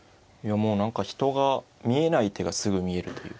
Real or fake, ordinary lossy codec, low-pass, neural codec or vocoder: real; none; none; none